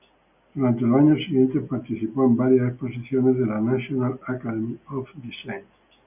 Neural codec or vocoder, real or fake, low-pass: none; real; 3.6 kHz